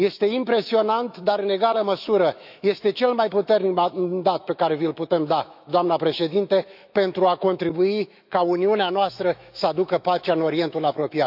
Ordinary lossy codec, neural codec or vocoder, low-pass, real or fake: none; autoencoder, 48 kHz, 128 numbers a frame, DAC-VAE, trained on Japanese speech; 5.4 kHz; fake